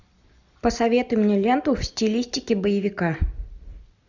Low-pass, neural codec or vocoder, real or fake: 7.2 kHz; none; real